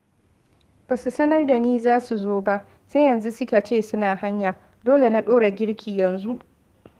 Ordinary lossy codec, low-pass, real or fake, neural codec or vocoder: Opus, 24 kbps; 14.4 kHz; fake; codec, 32 kHz, 1.9 kbps, SNAC